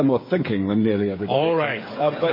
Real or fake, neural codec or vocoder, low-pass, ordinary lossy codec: fake; codec, 16 kHz, 8 kbps, FreqCodec, smaller model; 5.4 kHz; MP3, 24 kbps